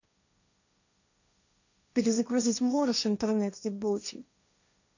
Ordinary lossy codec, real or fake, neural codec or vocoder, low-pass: none; fake; codec, 16 kHz, 1.1 kbps, Voila-Tokenizer; 7.2 kHz